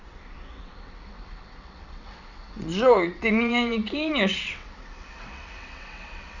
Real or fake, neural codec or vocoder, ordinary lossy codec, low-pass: fake; vocoder, 22.05 kHz, 80 mel bands, WaveNeXt; none; 7.2 kHz